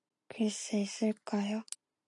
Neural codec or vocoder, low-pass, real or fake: none; 10.8 kHz; real